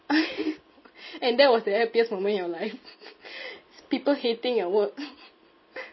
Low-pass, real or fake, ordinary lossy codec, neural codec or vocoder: 7.2 kHz; real; MP3, 24 kbps; none